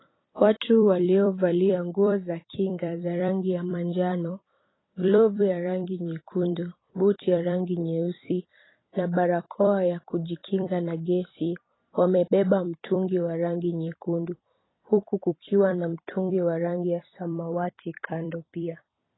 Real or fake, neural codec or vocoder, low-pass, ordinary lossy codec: fake; vocoder, 44.1 kHz, 128 mel bands every 256 samples, BigVGAN v2; 7.2 kHz; AAC, 16 kbps